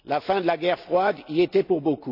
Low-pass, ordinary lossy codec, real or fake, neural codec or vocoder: 5.4 kHz; none; real; none